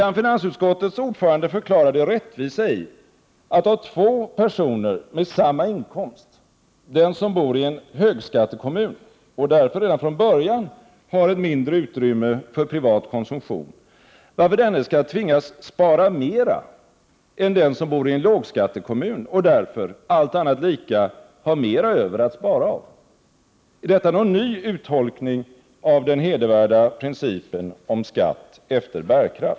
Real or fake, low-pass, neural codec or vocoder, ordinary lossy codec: real; none; none; none